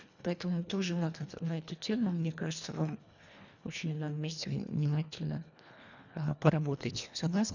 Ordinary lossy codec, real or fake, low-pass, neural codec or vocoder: none; fake; 7.2 kHz; codec, 24 kHz, 1.5 kbps, HILCodec